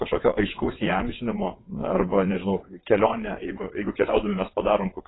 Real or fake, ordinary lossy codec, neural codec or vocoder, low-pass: fake; AAC, 16 kbps; vocoder, 22.05 kHz, 80 mel bands, WaveNeXt; 7.2 kHz